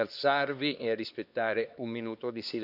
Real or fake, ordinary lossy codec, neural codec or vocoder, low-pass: fake; none; codec, 16 kHz, 2 kbps, X-Codec, HuBERT features, trained on LibriSpeech; 5.4 kHz